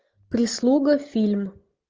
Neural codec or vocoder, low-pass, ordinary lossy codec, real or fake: none; 7.2 kHz; Opus, 24 kbps; real